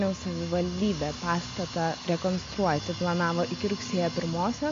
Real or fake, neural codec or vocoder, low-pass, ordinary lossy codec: real; none; 7.2 kHz; AAC, 48 kbps